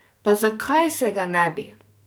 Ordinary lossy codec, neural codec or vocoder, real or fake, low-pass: none; codec, 44.1 kHz, 2.6 kbps, SNAC; fake; none